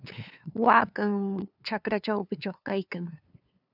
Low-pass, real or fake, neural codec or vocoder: 5.4 kHz; fake; codec, 16 kHz, 2 kbps, FunCodec, trained on LibriTTS, 25 frames a second